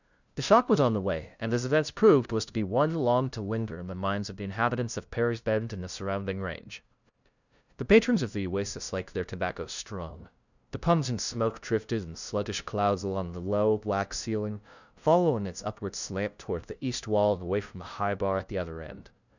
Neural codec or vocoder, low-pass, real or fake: codec, 16 kHz, 0.5 kbps, FunCodec, trained on LibriTTS, 25 frames a second; 7.2 kHz; fake